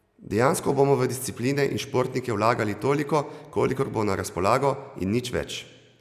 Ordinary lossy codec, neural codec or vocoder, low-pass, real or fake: none; none; 14.4 kHz; real